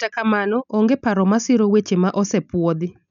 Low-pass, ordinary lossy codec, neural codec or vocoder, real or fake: 7.2 kHz; none; none; real